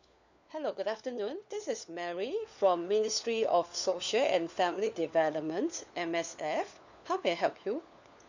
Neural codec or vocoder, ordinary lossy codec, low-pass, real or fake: codec, 16 kHz, 4 kbps, FunCodec, trained on LibriTTS, 50 frames a second; none; 7.2 kHz; fake